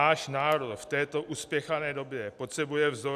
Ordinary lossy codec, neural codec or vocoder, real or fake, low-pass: AAC, 96 kbps; none; real; 14.4 kHz